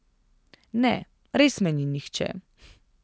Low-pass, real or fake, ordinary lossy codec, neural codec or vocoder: none; real; none; none